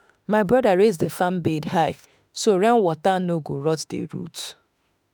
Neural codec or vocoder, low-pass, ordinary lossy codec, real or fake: autoencoder, 48 kHz, 32 numbers a frame, DAC-VAE, trained on Japanese speech; none; none; fake